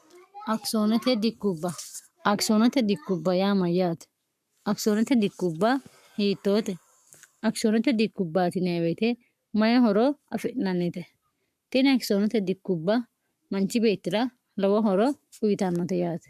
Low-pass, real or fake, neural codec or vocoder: 14.4 kHz; fake; codec, 44.1 kHz, 7.8 kbps, Pupu-Codec